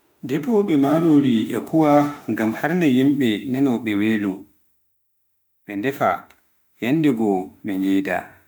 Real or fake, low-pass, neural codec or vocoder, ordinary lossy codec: fake; none; autoencoder, 48 kHz, 32 numbers a frame, DAC-VAE, trained on Japanese speech; none